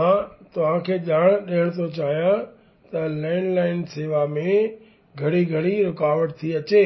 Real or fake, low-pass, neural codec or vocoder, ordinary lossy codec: real; 7.2 kHz; none; MP3, 24 kbps